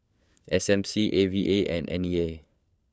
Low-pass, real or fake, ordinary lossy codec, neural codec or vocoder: none; fake; none; codec, 16 kHz, 4 kbps, FunCodec, trained on LibriTTS, 50 frames a second